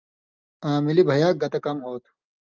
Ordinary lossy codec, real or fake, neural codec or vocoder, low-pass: Opus, 32 kbps; real; none; 7.2 kHz